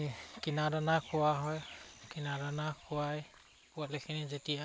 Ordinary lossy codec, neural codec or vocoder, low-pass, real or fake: none; none; none; real